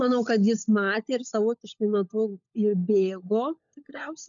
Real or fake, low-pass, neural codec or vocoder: fake; 7.2 kHz; codec, 16 kHz, 16 kbps, FunCodec, trained on LibriTTS, 50 frames a second